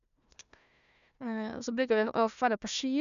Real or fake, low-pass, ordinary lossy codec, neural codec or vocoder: fake; 7.2 kHz; none; codec, 16 kHz, 1 kbps, FunCodec, trained on Chinese and English, 50 frames a second